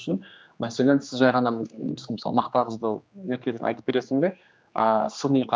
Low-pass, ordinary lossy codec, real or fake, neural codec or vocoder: none; none; fake; codec, 16 kHz, 2 kbps, X-Codec, HuBERT features, trained on general audio